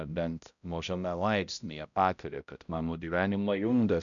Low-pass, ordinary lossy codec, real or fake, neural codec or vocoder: 7.2 kHz; MP3, 96 kbps; fake; codec, 16 kHz, 0.5 kbps, X-Codec, HuBERT features, trained on balanced general audio